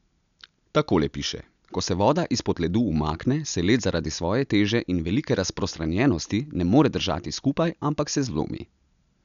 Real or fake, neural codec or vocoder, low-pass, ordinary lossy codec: real; none; 7.2 kHz; none